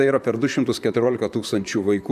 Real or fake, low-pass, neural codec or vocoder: fake; 14.4 kHz; vocoder, 44.1 kHz, 128 mel bands, Pupu-Vocoder